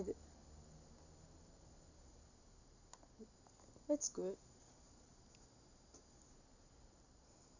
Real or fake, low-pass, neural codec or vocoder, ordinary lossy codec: real; 7.2 kHz; none; none